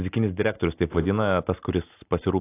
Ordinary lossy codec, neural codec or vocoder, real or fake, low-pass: AAC, 24 kbps; none; real; 3.6 kHz